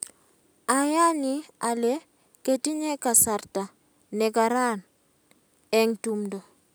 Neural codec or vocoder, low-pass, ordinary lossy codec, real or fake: none; none; none; real